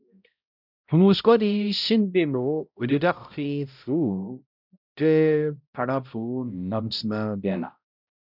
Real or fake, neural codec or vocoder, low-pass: fake; codec, 16 kHz, 0.5 kbps, X-Codec, HuBERT features, trained on balanced general audio; 5.4 kHz